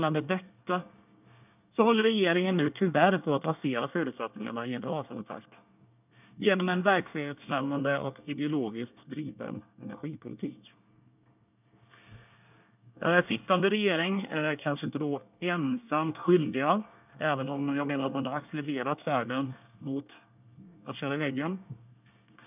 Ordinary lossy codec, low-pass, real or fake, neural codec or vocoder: none; 3.6 kHz; fake; codec, 24 kHz, 1 kbps, SNAC